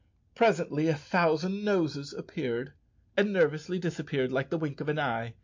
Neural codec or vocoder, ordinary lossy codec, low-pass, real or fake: none; MP3, 48 kbps; 7.2 kHz; real